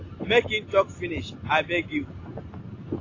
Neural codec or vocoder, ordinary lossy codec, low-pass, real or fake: none; AAC, 32 kbps; 7.2 kHz; real